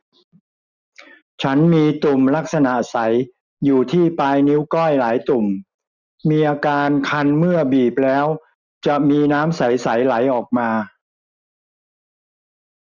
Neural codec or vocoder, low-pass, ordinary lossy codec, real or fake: none; 7.2 kHz; none; real